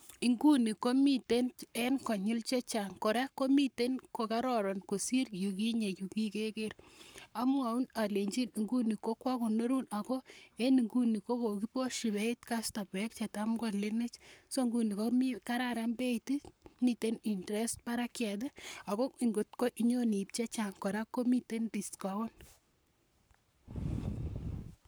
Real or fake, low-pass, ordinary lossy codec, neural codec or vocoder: fake; none; none; codec, 44.1 kHz, 7.8 kbps, Pupu-Codec